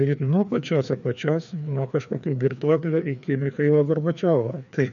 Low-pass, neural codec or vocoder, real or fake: 7.2 kHz; codec, 16 kHz, 2 kbps, FreqCodec, larger model; fake